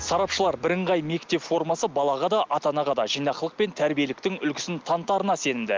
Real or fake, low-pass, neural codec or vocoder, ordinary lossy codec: real; 7.2 kHz; none; Opus, 24 kbps